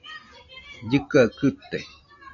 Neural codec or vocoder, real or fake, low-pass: none; real; 7.2 kHz